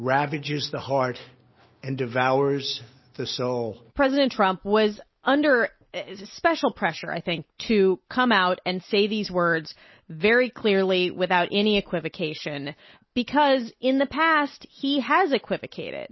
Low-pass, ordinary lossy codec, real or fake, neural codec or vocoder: 7.2 kHz; MP3, 24 kbps; real; none